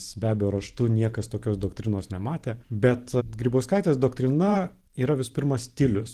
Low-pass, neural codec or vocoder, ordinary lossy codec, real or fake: 14.4 kHz; vocoder, 44.1 kHz, 128 mel bands every 512 samples, BigVGAN v2; Opus, 16 kbps; fake